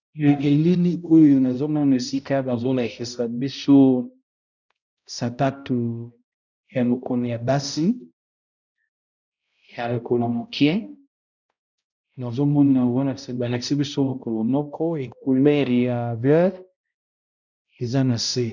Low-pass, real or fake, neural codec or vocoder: 7.2 kHz; fake; codec, 16 kHz, 0.5 kbps, X-Codec, HuBERT features, trained on balanced general audio